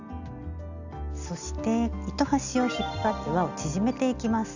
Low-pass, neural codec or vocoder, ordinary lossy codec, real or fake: 7.2 kHz; none; none; real